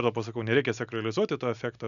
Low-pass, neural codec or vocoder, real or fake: 7.2 kHz; none; real